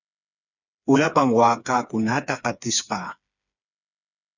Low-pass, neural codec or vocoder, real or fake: 7.2 kHz; codec, 16 kHz, 4 kbps, FreqCodec, smaller model; fake